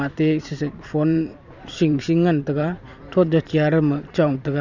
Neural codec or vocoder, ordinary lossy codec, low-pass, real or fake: vocoder, 44.1 kHz, 128 mel bands every 512 samples, BigVGAN v2; none; 7.2 kHz; fake